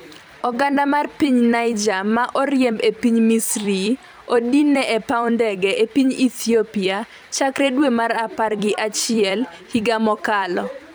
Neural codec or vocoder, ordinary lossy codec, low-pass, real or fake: vocoder, 44.1 kHz, 128 mel bands every 256 samples, BigVGAN v2; none; none; fake